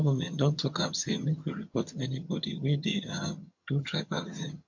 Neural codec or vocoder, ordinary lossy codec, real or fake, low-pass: vocoder, 22.05 kHz, 80 mel bands, HiFi-GAN; MP3, 48 kbps; fake; 7.2 kHz